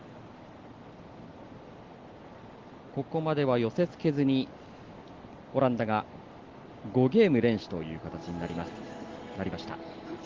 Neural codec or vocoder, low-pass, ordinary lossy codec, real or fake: none; 7.2 kHz; Opus, 16 kbps; real